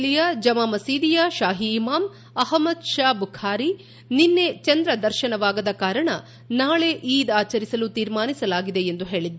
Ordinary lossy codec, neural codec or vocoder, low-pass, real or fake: none; none; none; real